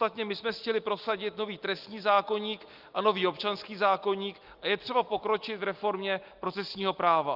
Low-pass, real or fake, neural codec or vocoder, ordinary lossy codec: 5.4 kHz; real; none; Opus, 32 kbps